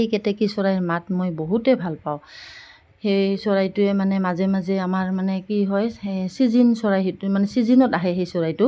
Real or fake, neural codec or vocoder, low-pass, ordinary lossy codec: real; none; none; none